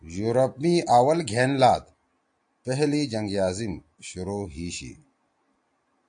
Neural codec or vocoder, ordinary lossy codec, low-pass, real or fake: none; AAC, 64 kbps; 9.9 kHz; real